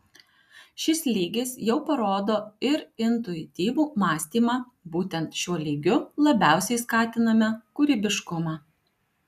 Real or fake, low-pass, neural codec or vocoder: real; 14.4 kHz; none